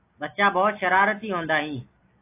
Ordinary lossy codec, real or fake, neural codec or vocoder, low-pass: AAC, 24 kbps; real; none; 3.6 kHz